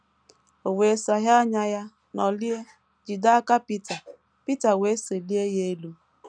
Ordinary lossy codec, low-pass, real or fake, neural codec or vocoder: none; 9.9 kHz; real; none